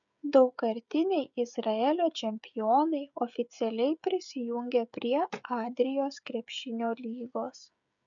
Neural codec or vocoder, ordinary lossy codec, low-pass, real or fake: codec, 16 kHz, 16 kbps, FreqCodec, smaller model; MP3, 96 kbps; 7.2 kHz; fake